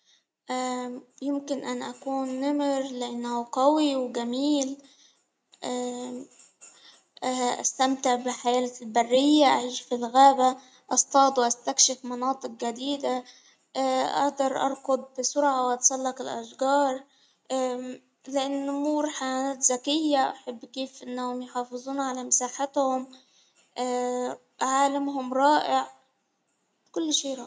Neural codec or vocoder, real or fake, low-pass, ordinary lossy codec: none; real; none; none